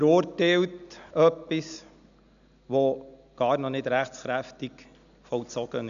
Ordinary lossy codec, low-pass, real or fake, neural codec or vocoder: none; 7.2 kHz; real; none